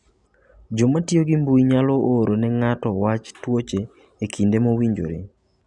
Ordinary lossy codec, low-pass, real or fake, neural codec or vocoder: none; 10.8 kHz; real; none